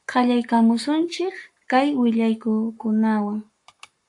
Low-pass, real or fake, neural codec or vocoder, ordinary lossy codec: 10.8 kHz; fake; codec, 44.1 kHz, 7.8 kbps, Pupu-Codec; AAC, 64 kbps